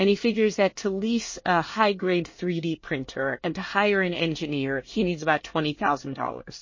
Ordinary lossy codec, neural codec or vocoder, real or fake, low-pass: MP3, 32 kbps; codec, 24 kHz, 1 kbps, SNAC; fake; 7.2 kHz